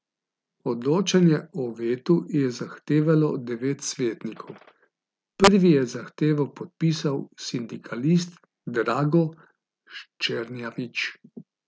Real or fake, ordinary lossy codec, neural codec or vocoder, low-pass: real; none; none; none